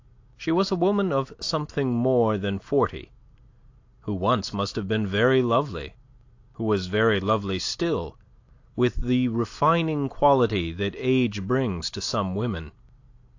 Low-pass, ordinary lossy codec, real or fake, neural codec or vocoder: 7.2 kHz; AAC, 48 kbps; real; none